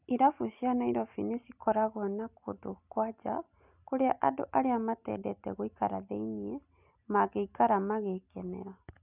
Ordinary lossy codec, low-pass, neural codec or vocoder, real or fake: none; 3.6 kHz; none; real